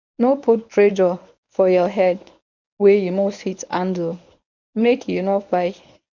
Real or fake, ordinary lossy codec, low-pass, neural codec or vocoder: fake; none; 7.2 kHz; codec, 24 kHz, 0.9 kbps, WavTokenizer, medium speech release version 1